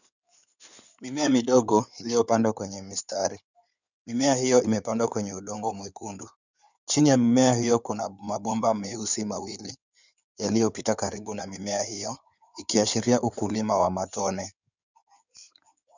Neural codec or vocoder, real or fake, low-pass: codec, 16 kHz in and 24 kHz out, 2.2 kbps, FireRedTTS-2 codec; fake; 7.2 kHz